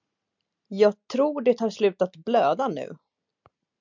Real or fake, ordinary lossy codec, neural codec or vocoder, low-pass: real; MP3, 64 kbps; none; 7.2 kHz